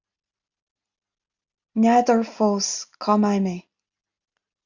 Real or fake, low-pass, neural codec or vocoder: real; 7.2 kHz; none